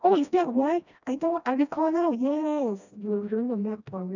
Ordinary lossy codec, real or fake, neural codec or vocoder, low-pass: none; fake; codec, 16 kHz, 1 kbps, FreqCodec, smaller model; 7.2 kHz